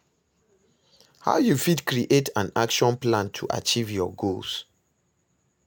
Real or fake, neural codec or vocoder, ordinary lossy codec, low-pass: real; none; none; none